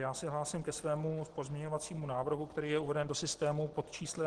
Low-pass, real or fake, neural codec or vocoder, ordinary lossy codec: 10.8 kHz; real; none; Opus, 16 kbps